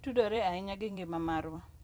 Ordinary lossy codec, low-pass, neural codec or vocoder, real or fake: none; none; vocoder, 44.1 kHz, 128 mel bands every 256 samples, BigVGAN v2; fake